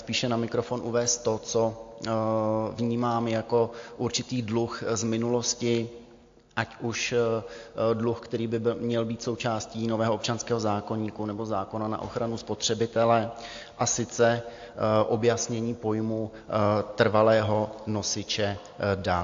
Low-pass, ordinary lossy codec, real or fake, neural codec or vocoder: 7.2 kHz; AAC, 48 kbps; real; none